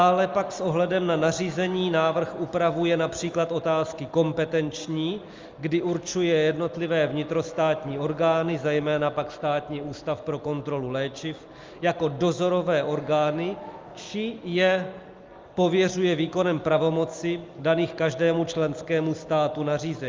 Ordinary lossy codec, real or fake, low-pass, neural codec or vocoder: Opus, 32 kbps; real; 7.2 kHz; none